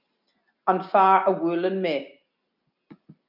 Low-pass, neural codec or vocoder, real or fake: 5.4 kHz; none; real